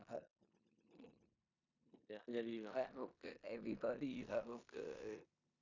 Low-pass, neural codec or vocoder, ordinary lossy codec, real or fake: 7.2 kHz; codec, 16 kHz in and 24 kHz out, 0.9 kbps, LongCat-Audio-Codec, four codebook decoder; none; fake